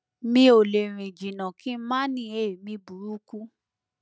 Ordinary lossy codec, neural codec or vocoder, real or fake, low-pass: none; none; real; none